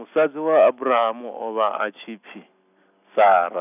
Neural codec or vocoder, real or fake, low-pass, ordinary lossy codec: none; real; 3.6 kHz; none